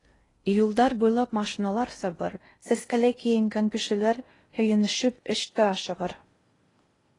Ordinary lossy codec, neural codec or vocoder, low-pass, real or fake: AAC, 32 kbps; codec, 16 kHz in and 24 kHz out, 0.6 kbps, FocalCodec, streaming, 2048 codes; 10.8 kHz; fake